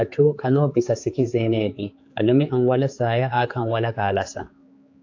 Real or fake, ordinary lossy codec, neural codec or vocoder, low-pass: fake; AAC, 48 kbps; codec, 16 kHz, 4 kbps, X-Codec, HuBERT features, trained on general audio; 7.2 kHz